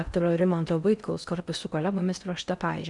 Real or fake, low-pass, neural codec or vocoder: fake; 10.8 kHz; codec, 16 kHz in and 24 kHz out, 0.6 kbps, FocalCodec, streaming, 4096 codes